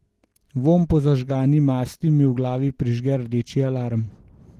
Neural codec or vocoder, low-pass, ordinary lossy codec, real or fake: none; 14.4 kHz; Opus, 16 kbps; real